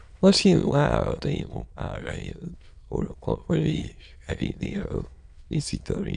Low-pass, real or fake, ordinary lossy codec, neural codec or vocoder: 9.9 kHz; fake; none; autoencoder, 22.05 kHz, a latent of 192 numbers a frame, VITS, trained on many speakers